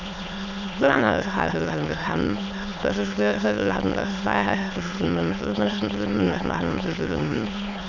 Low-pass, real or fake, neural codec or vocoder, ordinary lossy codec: 7.2 kHz; fake; autoencoder, 22.05 kHz, a latent of 192 numbers a frame, VITS, trained on many speakers; none